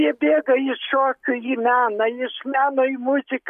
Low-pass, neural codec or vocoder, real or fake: 14.4 kHz; none; real